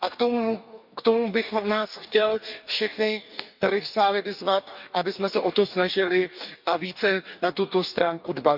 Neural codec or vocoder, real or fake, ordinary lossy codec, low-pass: codec, 44.1 kHz, 2.6 kbps, DAC; fake; none; 5.4 kHz